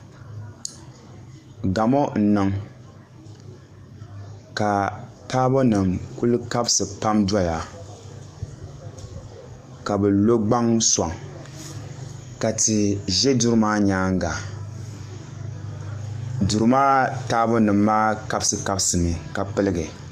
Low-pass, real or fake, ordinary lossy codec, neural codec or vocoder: 14.4 kHz; fake; Opus, 64 kbps; codec, 44.1 kHz, 7.8 kbps, DAC